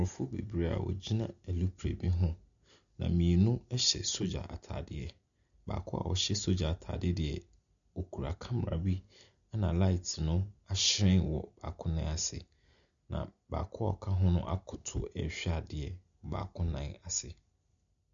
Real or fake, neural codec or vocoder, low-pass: real; none; 7.2 kHz